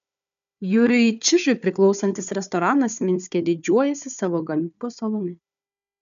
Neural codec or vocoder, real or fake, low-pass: codec, 16 kHz, 4 kbps, FunCodec, trained on Chinese and English, 50 frames a second; fake; 7.2 kHz